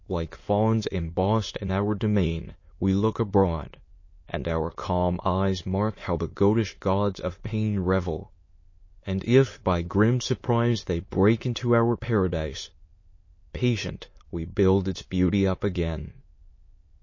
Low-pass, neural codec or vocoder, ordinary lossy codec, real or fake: 7.2 kHz; autoencoder, 22.05 kHz, a latent of 192 numbers a frame, VITS, trained on many speakers; MP3, 32 kbps; fake